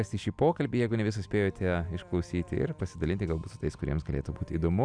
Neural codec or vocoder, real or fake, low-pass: none; real; 9.9 kHz